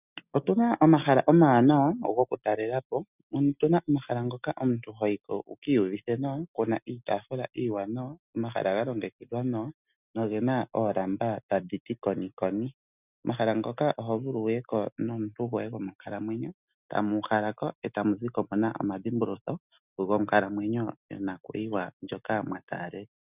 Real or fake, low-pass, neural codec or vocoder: real; 3.6 kHz; none